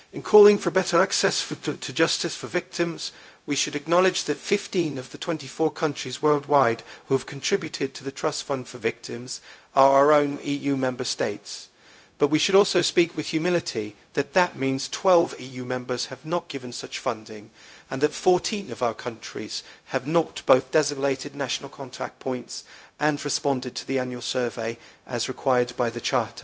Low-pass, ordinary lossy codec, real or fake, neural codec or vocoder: none; none; fake; codec, 16 kHz, 0.4 kbps, LongCat-Audio-Codec